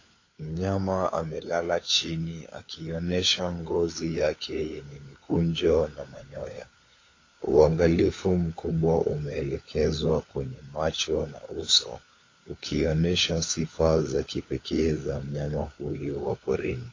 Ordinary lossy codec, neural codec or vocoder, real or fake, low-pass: AAC, 32 kbps; codec, 16 kHz, 4 kbps, FunCodec, trained on LibriTTS, 50 frames a second; fake; 7.2 kHz